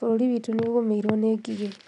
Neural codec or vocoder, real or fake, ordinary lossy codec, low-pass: none; real; none; 10.8 kHz